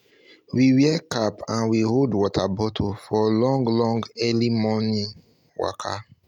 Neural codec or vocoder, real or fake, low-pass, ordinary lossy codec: none; real; 19.8 kHz; MP3, 96 kbps